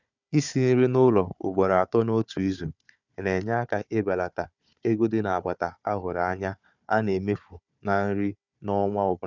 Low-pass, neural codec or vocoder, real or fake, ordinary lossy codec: 7.2 kHz; codec, 16 kHz, 4 kbps, FunCodec, trained on Chinese and English, 50 frames a second; fake; none